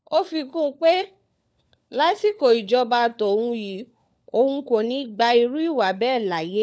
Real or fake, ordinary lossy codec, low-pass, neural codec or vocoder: fake; none; none; codec, 16 kHz, 4 kbps, FunCodec, trained on LibriTTS, 50 frames a second